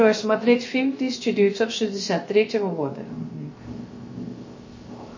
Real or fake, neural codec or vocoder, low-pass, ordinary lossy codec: fake; codec, 16 kHz, 0.3 kbps, FocalCodec; 7.2 kHz; MP3, 32 kbps